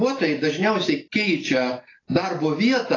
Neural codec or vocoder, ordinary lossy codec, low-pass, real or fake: none; AAC, 32 kbps; 7.2 kHz; real